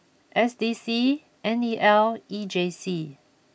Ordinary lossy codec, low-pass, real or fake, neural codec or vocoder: none; none; real; none